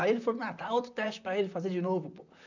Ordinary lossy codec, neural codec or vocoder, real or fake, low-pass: none; vocoder, 44.1 kHz, 128 mel bands, Pupu-Vocoder; fake; 7.2 kHz